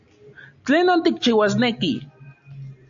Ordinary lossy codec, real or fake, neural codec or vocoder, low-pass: AAC, 64 kbps; real; none; 7.2 kHz